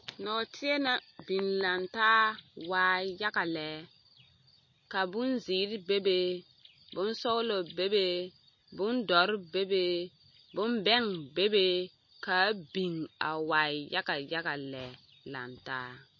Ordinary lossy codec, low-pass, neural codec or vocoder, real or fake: MP3, 32 kbps; 7.2 kHz; none; real